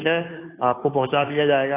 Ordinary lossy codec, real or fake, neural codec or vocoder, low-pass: AAC, 24 kbps; fake; codec, 16 kHz, 2 kbps, FunCodec, trained on Chinese and English, 25 frames a second; 3.6 kHz